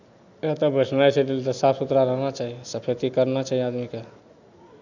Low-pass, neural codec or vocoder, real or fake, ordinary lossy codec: 7.2 kHz; none; real; none